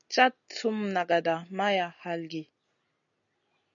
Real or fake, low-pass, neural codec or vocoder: real; 7.2 kHz; none